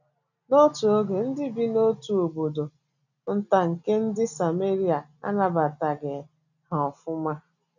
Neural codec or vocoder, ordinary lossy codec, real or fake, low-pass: none; none; real; 7.2 kHz